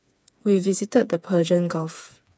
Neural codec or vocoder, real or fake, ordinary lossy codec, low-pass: codec, 16 kHz, 4 kbps, FreqCodec, smaller model; fake; none; none